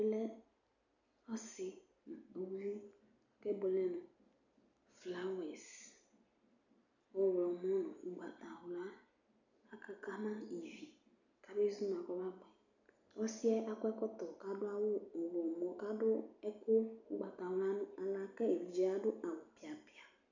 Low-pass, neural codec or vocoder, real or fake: 7.2 kHz; none; real